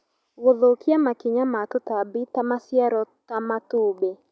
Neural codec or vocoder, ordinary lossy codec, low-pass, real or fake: none; none; none; real